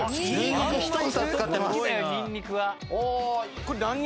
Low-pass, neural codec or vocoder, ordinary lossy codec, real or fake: none; none; none; real